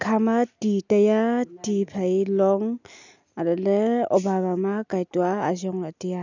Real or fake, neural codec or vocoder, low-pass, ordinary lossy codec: real; none; 7.2 kHz; none